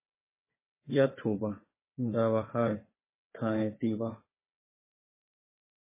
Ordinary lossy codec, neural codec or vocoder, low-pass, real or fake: MP3, 16 kbps; codec, 16 kHz, 4 kbps, FunCodec, trained on Chinese and English, 50 frames a second; 3.6 kHz; fake